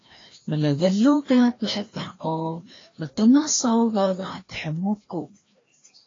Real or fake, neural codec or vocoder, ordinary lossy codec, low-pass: fake; codec, 16 kHz, 1 kbps, FreqCodec, larger model; AAC, 32 kbps; 7.2 kHz